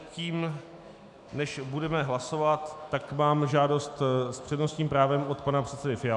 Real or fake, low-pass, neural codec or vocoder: fake; 10.8 kHz; autoencoder, 48 kHz, 128 numbers a frame, DAC-VAE, trained on Japanese speech